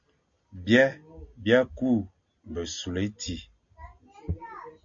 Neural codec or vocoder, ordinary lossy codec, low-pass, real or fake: none; MP3, 48 kbps; 7.2 kHz; real